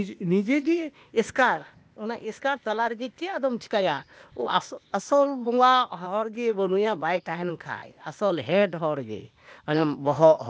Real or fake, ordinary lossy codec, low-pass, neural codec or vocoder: fake; none; none; codec, 16 kHz, 0.8 kbps, ZipCodec